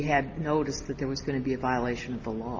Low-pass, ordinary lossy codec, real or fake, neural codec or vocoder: 7.2 kHz; Opus, 24 kbps; real; none